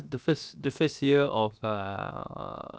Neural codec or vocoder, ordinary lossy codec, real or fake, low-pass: codec, 16 kHz, 0.7 kbps, FocalCodec; none; fake; none